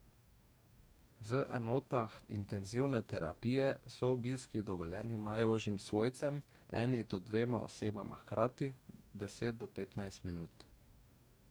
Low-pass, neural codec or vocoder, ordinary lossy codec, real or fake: none; codec, 44.1 kHz, 2.6 kbps, DAC; none; fake